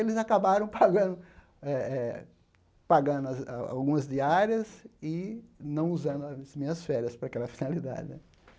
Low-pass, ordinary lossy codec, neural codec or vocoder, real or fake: none; none; none; real